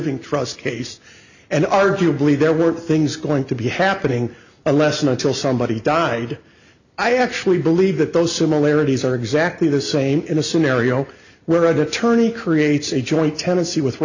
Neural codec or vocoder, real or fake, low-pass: none; real; 7.2 kHz